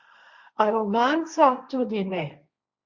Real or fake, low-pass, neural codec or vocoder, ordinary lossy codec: fake; 7.2 kHz; codec, 16 kHz, 1.1 kbps, Voila-Tokenizer; Opus, 64 kbps